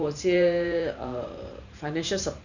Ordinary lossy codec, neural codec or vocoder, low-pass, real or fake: none; vocoder, 44.1 kHz, 128 mel bands every 512 samples, BigVGAN v2; 7.2 kHz; fake